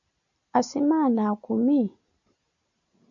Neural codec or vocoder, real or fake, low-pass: none; real; 7.2 kHz